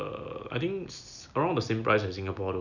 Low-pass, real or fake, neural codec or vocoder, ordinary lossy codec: 7.2 kHz; real; none; none